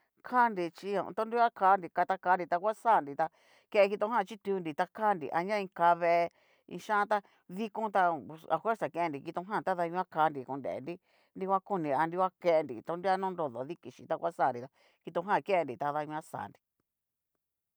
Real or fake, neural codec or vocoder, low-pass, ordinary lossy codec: real; none; none; none